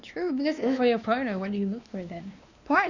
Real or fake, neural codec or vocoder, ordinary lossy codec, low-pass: fake; codec, 16 kHz, 2 kbps, X-Codec, WavLM features, trained on Multilingual LibriSpeech; none; 7.2 kHz